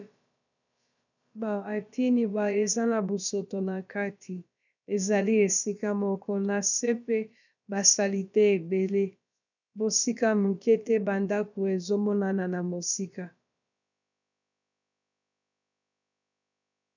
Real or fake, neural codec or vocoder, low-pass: fake; codec, 16 kHz, about 1 kbps, DyCAST, with the encoder's durations; 7.2 kHz